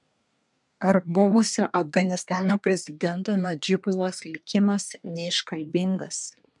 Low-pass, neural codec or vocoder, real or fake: 10.8 kHz; codec, 24 kHz, 1 kbps, SNAC; fake